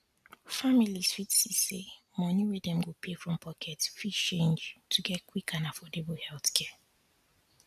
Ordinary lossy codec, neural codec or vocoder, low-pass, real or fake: none; none; 14.4 kHz; real